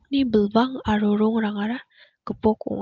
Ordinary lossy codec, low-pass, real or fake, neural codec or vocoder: Opus, 24 kbps; 7.2 kHz; real; none